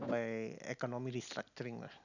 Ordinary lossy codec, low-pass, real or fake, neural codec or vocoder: none; 7.2 kHz; real; none